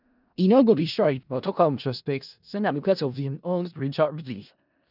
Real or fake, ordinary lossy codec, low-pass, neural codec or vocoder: fake; none; 5.4 kHz; codec, 16 kHz in and 24 kHz out, 0.4 kbps, LongCat-Audio-Codec, four codebook decoder